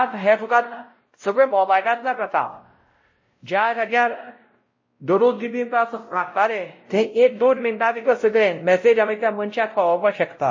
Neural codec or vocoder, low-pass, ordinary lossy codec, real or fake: codec, 16 kHz, 0.5 kbps, X-Codec, WavLM features, trained on Multilingual LibriSpeech; 7.2 kHz; MP3, 32 kbps; fake